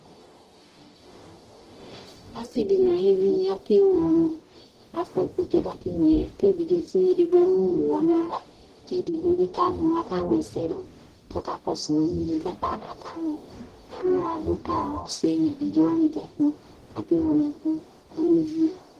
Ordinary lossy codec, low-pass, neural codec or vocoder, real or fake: Opus, 16 kbps; 14.4 kHz; codec, 44.1 kHz, 0.9 kbps, DAC; fake